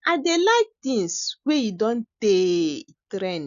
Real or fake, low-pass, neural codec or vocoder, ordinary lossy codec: real; 7.2 kHz; none; none